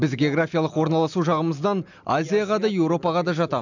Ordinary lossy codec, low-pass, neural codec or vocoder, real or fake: none; 7.2 kHz; none; real